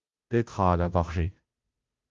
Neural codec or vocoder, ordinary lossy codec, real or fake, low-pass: codec, 16 kHz, 0.5 kbps, FunCodec, trained on Chinese and English, 25 frames a second; Opus, 32 kbps; fake; 7.2 kHz